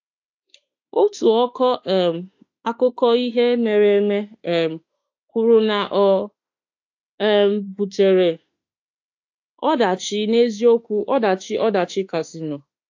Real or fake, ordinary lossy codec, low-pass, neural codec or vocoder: fake; AAC, 48 kbps; 7.2 kHz; autoencoder, 48 kHz, 32 numbers a frame, DAC-VAE, trained on Japanese speech